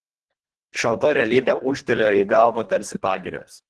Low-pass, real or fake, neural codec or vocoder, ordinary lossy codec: 10.8 kHz; fake; codec, 24 kHz, 1.5 kbps, HILCodec; Opus, 16 kbps